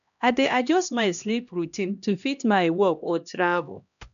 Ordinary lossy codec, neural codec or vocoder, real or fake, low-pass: none; codec, 16 kHz, 1 kbps, X-Codec, HuBERT features, trained on LibriSpeech; fake; 7.2 kHz